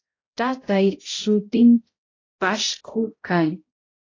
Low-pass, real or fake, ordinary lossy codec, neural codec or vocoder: 7.2 kHz; fake; AAC, 32 kbps; codec, 16 kHz, 0.5 kbps, X-Codec, HuBERT features, trained on balanced general audio